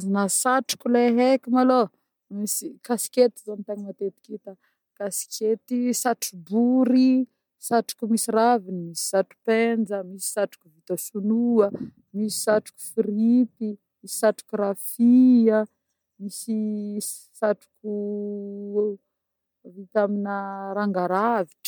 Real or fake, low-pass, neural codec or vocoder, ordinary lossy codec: real; 19.8 kHz; none; MP3, 96 kbps